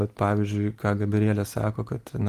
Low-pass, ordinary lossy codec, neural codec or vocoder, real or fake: 14.4 kHz; Opus, 24 kbps; none; real